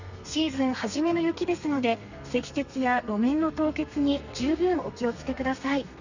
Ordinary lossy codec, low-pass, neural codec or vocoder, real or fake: none; 7.2 kHz; codec, 32 kHz, 1.9 kbps, SNAC; fake